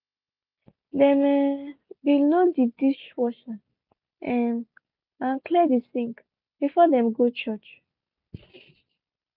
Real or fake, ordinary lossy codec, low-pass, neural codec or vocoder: real; none; 5.4 kHz; none